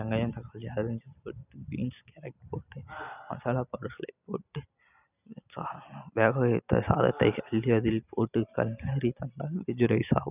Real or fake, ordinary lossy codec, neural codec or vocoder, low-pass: real; none; none; 3.6 kHz